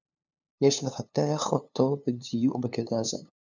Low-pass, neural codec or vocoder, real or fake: 7.2 kHz; codec, 16 kHz, 2 kbps, FunCodec, trained on LibriTTS, 25 frames a second; fake